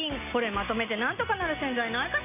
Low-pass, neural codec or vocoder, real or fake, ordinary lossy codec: 3.6 kHz; none; real; none